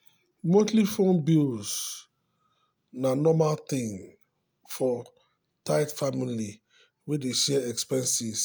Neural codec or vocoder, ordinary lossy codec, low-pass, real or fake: none; none; none; real